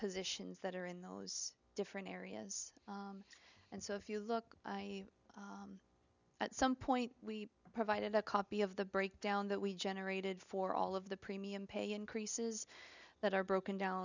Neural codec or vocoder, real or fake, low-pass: none; real; 7.2 kHz